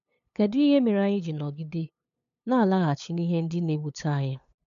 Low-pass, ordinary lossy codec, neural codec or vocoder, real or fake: 7.2 kHz; none; codec, 16 kHz, 8 kbps, FunCodec, trained on LibriTTS, 25 frames a second; fake